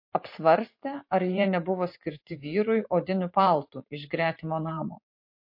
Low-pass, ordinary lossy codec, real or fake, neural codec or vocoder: 5.4 kHz; MP3, 32 kbps; fake; vocoder, 44.1 kHz, 128 mel bands every 512 samples, BigVGAN v2